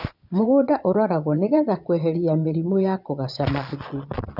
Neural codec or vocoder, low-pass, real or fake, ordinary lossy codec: vocoder, 22.05 kHz, 80 mel bands, Vocos; 5.4 kHz; fake; none